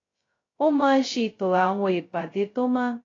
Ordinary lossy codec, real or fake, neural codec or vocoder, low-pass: AAC, 32 kbps; fake; codec, 16 kHz, 0.2 kbps, FocalCodec; 7.2 kHz